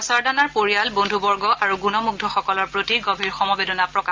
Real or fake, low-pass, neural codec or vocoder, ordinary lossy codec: real; 7.2 kHz; none; Opus, 24 kbps